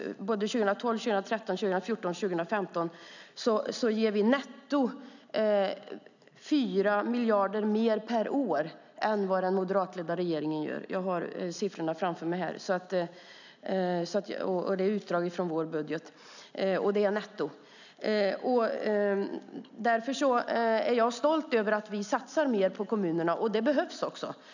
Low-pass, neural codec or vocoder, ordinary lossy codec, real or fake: 7.2 kHz; none; none; real